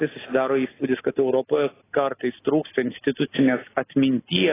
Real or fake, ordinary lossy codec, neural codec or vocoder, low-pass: real; AAC, 16 kbps; none; 3.6 kHz